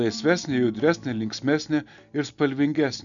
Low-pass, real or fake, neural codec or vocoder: 7.2 kHz; real; none